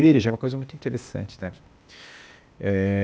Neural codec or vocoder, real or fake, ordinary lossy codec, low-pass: codec, 16 kHz, 0.8 kbps, ZipCodec; fake; none; none